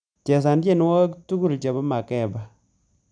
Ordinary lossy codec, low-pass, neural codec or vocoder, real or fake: none; 9.9 kHz; none; real